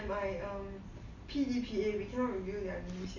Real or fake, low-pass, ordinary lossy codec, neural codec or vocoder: real; 7.2 kHz; none; none